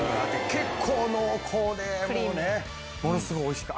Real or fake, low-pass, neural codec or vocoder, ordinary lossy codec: real; none; none; none